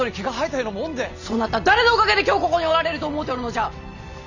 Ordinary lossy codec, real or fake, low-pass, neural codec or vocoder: none; real; 7.2 kHz; none